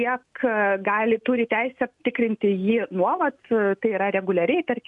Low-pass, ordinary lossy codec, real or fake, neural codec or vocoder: 10.8 kHz; MP3, 96 kbps; real; none